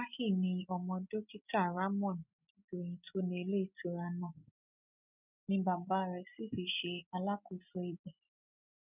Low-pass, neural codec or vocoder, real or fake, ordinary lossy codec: 3.6 kHz; none; real; none